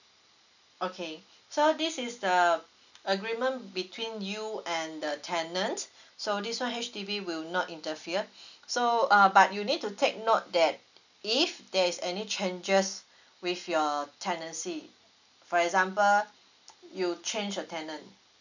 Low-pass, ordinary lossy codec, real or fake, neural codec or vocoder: 7.2 kHz; none; real; none